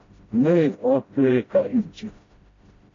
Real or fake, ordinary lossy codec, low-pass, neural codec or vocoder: fake; AAC, 32 kbps; 7.2 kHz; codec, 16 kHz, 0.5 kbps, FreqCodec, smaller model